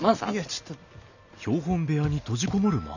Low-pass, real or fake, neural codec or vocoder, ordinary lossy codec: 7.2 kHz; real; none; none